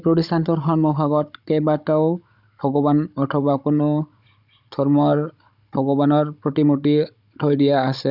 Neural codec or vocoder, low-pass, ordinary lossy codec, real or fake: codec, 16 kHz, 16 kbps, FunCodec, trained on Chinese and English, 50 frames a second; 5.4 kHz; none; fake